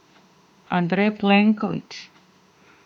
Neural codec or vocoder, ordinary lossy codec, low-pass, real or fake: autoencoder, 48 kHz, 32 numbers a frame, DAC-VAE, trained on Japanese speech; none; 19.8 kHz; fake